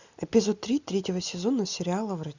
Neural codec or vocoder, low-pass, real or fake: none; 7.2 kHz; real